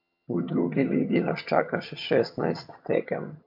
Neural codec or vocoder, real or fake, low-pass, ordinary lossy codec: vocoder, 22.05 kHz, 80 mel bands, HiFi-GAN; fake; 5.4 kHz; MP3, 48 kbps